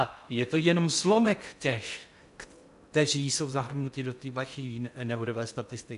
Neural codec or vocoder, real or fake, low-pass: codec, 16 kHz in and 24 kHz out, 0.6 kbps, FocalCodec, streaming, 2048 codes; fake; 10.8 kHz